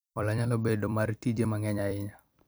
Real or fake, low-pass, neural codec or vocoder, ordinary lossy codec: fake; none; vocoder, 44.1 kHz, 128 mel bands every 256 samples, BigVGAN v2; none